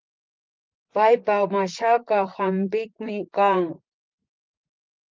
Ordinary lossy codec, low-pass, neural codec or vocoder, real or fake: Opus, 32 kbps; 7.2 kHz; vocoder, 44.1 kHz, 128 mel bands, Pupu-Vocoder; fake